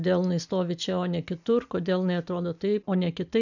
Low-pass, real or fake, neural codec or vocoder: 7.2 kHz; real; none